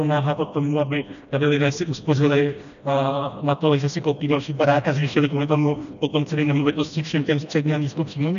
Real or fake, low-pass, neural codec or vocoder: fake; 7.2 kHz; codec, 16 kHz, 1 kbps, FreqCodec, smaller model